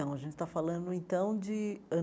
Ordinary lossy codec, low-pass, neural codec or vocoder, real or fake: none; none; none; real